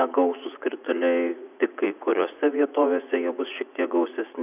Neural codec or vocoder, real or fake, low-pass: vocoder, 44.1 kHz, 80 mel bands, Vocos; fake; 3.6 kHz